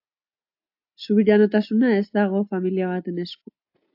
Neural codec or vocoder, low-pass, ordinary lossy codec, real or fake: none; 5.4 kHz; AAC, 48 kbps; real